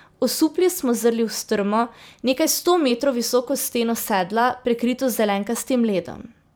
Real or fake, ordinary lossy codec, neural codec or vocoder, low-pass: fake; none; vocoder, 44.1 kHz, 128 mel bands every 256 samples, BigVGAN v2; none